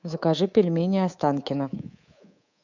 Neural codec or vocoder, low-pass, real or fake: codec, 24 kHz, 3.1 kbps, DualCodec; 7.2 kHz; fake